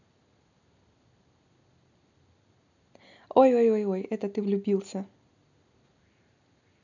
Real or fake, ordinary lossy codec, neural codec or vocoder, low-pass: real; none; none; 7.2 kHz